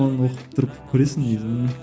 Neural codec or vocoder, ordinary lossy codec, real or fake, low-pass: none; none; real; none